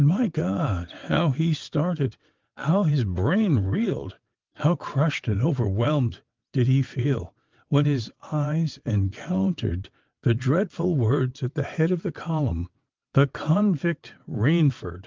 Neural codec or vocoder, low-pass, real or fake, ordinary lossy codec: vocoder, 22.05 kHz, 80 mel bands, WaveNeXt; 7.2 kHz; fake; Opus, 32 kbps